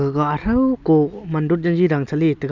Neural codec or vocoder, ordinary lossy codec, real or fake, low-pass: none; none; real; 7.2 kHz